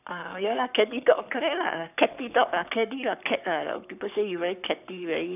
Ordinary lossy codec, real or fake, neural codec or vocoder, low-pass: none; fake; codec, 44.1 kHz, 7.8 kbps, Pupu-Codec; 3.6 kHz